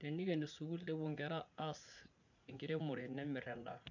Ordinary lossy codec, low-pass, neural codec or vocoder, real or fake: none; 7.2 kHz; vocoder, 44.1 kHz, 80 mel bands, Vocos; fake